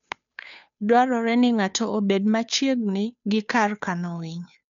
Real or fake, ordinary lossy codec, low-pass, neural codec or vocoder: fake; none; 7.2 kHz; codec, 16 kHz, 2 kbps, FunCodec, trained on Chinese and English, 25 frames a second